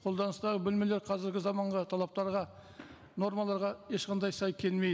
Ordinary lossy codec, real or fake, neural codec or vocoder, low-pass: none; real; none; none